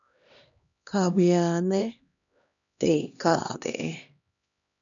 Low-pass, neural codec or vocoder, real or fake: 7.2 kHz; codec, 16 kHz, 1 kbps, X-Codec, HuBERT features, trained on LibriSpeech; fake